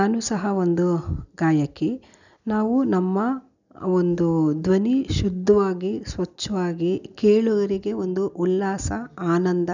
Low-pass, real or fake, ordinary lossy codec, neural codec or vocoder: 7.2 kHz; real; none; none